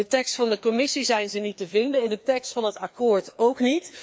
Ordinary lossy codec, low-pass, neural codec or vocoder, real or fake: none; none; codec, 16 kHz, 2 kbps, FreqCodec, larger model; fake